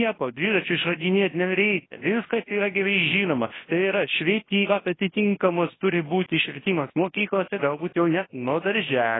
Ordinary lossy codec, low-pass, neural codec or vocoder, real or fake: AAC, 16 kbps; 7.2 kHz; codec, 24 kHz, 0.9 kbps, WavTokenizer, large speech release; fake